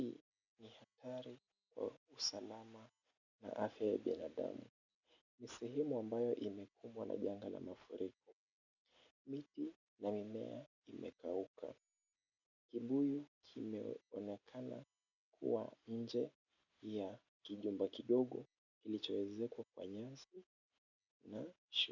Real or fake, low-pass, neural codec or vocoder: real; 7.2 kHz; none